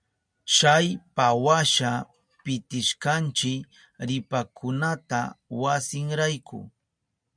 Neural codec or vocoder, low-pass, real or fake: none; 9.9 kHz; real